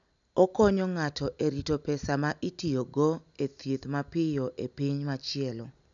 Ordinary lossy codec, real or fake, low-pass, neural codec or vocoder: none; real; 7.2 kHz; none